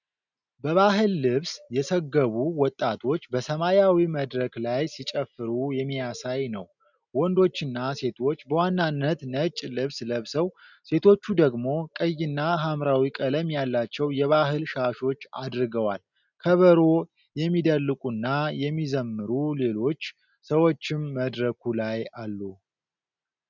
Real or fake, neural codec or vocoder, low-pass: real; none; 7.2 kHz